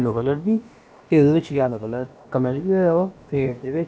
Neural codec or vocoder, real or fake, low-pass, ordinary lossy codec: codec, 16 kHz, about 1 kbps, DyCAST, with the encoder's durations; fake; none; none